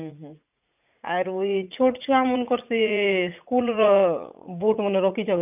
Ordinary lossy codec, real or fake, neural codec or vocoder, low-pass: none; fake; vocoder, 44.1 kHz, 80 mel bands, Vocos; 3.6 kHz